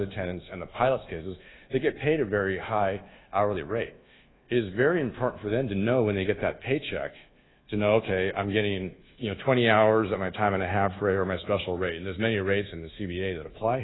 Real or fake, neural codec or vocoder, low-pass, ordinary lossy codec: fake; codec, 24 kHz, 1.2 kbps, DualCodec; 7.2 kHz; AAC, 16 kbps